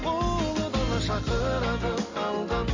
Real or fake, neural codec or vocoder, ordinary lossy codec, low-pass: real; none; AAC, 48 kbps; 7.2 kHz